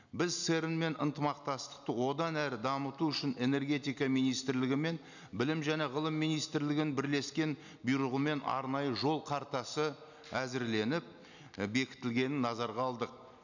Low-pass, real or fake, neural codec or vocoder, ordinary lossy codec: 7.2 kHz; real; none; none